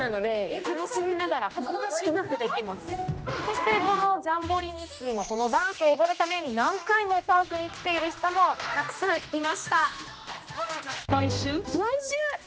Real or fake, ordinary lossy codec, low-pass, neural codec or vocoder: fake; none; none; codec, 16 kHz, 1 kbps, X-Codec, HuBERT features, trained on general audio